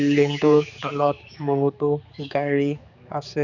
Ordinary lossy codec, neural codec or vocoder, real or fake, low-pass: none; codec, 16 kHz, 4 kbps, X-Codec, HuBERT features, trained on general audio; fake; 7.2 kHz